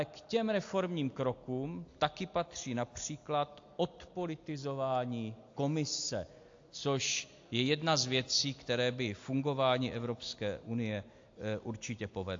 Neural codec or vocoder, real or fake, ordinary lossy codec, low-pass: none; real; AAC, 48 kbps; 7.2 kHz